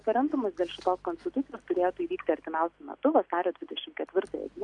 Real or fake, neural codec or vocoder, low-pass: real; none; 10.8 kHz